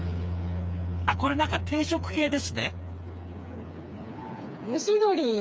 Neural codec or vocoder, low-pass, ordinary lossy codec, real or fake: codec, 16 kHz, 4 kbps, FreqCodec, smaller model; none; none; fake